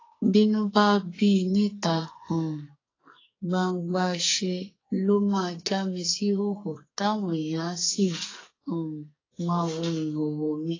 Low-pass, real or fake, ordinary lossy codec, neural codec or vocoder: 7.2 kHz; fake; AAC, 32 kbps; codec, 44.1 kHz, 2.6 kbps, SNAC